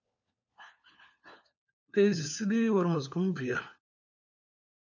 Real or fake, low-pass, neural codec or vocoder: fake; 7.2 kHz; codec, 16 kHz, 4 kbps, FunCodec, trained on LibriTTS, 50 frames a second